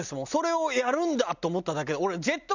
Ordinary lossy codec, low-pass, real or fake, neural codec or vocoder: none; 7.2 kHz; real; none